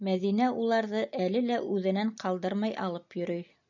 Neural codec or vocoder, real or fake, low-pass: none; real; 7.2 kHz